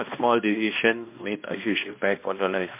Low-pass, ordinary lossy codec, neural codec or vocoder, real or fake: 3.6 kHz; MP3, 24 kbps; codec, 16 kHz, 1 kbps, X-Codec, HuBERT features, trained on balanced general audio; fake